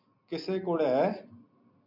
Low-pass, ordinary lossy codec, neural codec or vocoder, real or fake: 5.4 kHz; MP3, 48 kbps; none; real